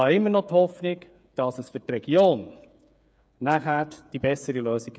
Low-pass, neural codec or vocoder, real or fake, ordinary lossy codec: none; codec, 16 kHz, 8 kbps, FreqCodec, smaller model; fake; none